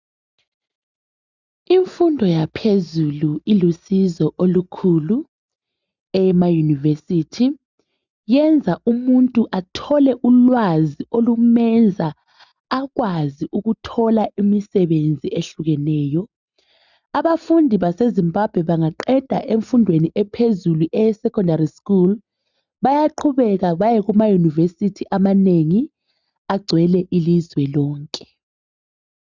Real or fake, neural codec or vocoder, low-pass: real; none; 7.2 kHz